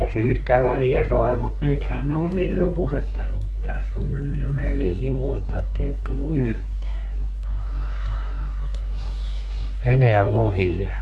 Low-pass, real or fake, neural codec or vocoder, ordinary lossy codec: none; fake; codec, 24 kHz, 1 kbps, SNAC; none